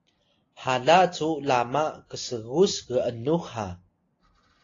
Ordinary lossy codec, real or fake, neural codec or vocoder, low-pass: AAC, 32 kbps; real; none; 7.2 kHz